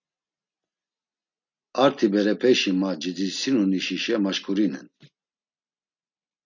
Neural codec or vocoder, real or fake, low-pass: none; real; 7.2 kHz